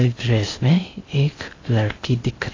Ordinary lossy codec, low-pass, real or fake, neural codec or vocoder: AAC, 32 kbps; 7.2 kHz; fake; codec, 16 kHz in and 24 kHz out, 0.6 kbps, FocalCodec, streaming, 4096 codes